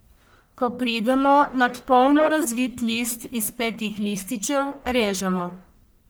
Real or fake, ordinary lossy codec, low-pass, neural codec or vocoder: fake; none; none; codec, 44.1 kHz, 1.7 kbps, Pupu-Codec